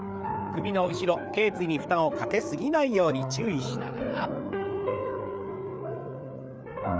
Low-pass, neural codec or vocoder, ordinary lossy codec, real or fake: none; codec, 16 kHz, 4 kbps, FreqCodec, larger model; none; fake